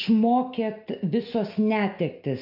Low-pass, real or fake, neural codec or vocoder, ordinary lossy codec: 5.4 kHz; real; none; MP3, 32 kbps